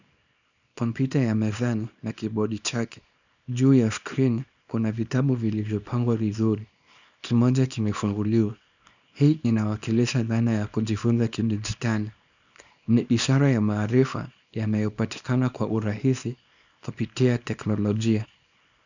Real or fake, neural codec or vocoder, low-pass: fake; codec, 24 kHz, 0.9 kbps, WavTokenizer, small release; 7.2 kHz